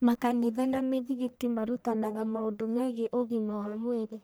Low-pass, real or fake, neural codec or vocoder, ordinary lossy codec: none; fake; codec, 44.1 kHz, 1.7 kbps, Pupu-Codec; none